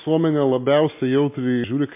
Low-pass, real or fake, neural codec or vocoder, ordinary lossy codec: 3.6 kHz; real; none; MP3, 24 kbps